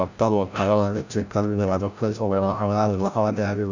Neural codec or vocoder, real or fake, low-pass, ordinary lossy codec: codec, 16 kHz, 0.5 kbps, FreqCodec, larger model; fake; 7.2 kHz; none